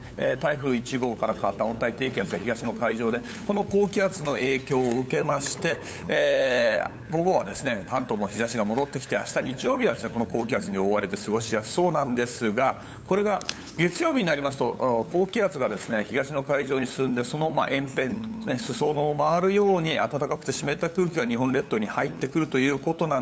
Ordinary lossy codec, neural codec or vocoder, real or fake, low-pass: none; codec, 16 kHz, 8 kbps, FunCodec, trained on LibriTTS, 25 frames a second; fake; none